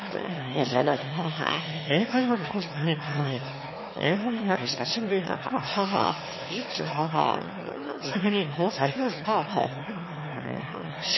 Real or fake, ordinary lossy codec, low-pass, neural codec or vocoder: fake; MP3, 24 kbps; 7.2 kHz; autoencoder, 22.05 kHz, a latent of 192 numbers a frame, VITS, trained on one speaker